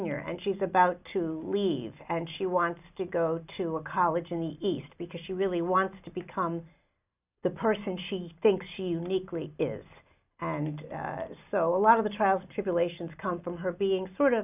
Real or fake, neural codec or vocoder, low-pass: real; none; 3.6 kHz